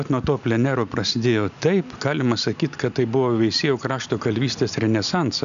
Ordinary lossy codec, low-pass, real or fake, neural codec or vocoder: MP3, 96 kbps; 7.2 kHz; real; none